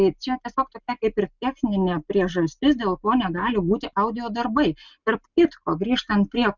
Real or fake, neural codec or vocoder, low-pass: real; none; 7.2 kHz